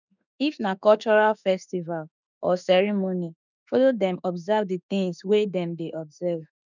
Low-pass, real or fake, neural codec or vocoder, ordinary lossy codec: 7.2 kHz; fake; autoencoder, 48 kHz, 32 numbers a frame, DAC-VAE, trained on Japanese speech; none